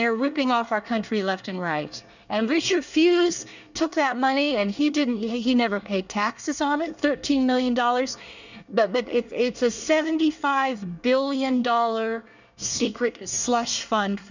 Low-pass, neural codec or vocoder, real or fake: 7.2 kHz; codec, 24 kHz, 1 kbps, SNAC; fake